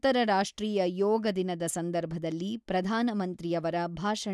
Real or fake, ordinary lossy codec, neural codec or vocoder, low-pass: real; none; none; none